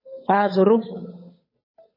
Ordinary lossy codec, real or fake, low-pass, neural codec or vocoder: MP3, 24 kbps; fake; 5.4 kHz; codec, 16 kHz, 8 kbps, FunCodec, trained on Chinese and English, 25 frames a second